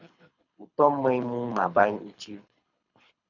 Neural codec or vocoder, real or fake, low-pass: codec, 24 kHz, 3 kbps, HILCodec; fake; 7.2 kHz